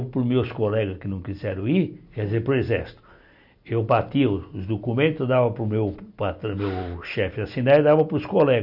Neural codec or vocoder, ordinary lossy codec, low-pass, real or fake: none; none; 5.4 kHz; real